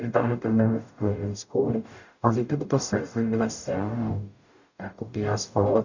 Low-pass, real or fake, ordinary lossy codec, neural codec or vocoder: 7.2 kHz; fake; none; codec, 44.1 kHz, 0.9 kbps, DAC